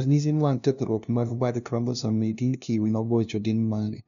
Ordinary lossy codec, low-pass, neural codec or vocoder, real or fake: none; 7.2 kHz; codec, 16 kHz, 0.5 kbps, FunCodec, trained on LibriTTS, 25 frames a second; fake